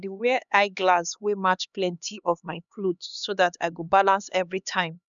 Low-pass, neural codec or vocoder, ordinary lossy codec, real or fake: 7.2 kHz; codec, 16 kHz, 2 kbps, X-Codec, HuBERT features, trained on LibriSpeech; none; fake